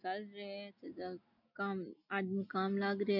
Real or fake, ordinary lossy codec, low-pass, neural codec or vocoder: real; none; 5.4 kHz; none